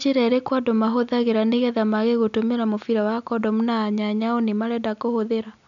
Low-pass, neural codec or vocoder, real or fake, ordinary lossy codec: 7.2 kHz; none; real; none